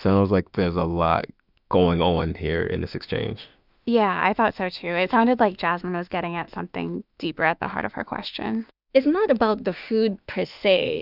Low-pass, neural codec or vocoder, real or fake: 5.4 kHz; autoencoder, 48 kHz, 32 numbers a frame, DAC-VAE, trained on Japanese speech; fake